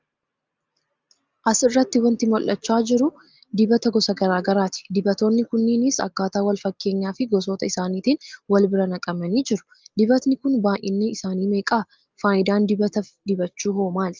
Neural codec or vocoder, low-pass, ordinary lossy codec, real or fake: none; 7.2 kHz; Opus, 32 kbps; real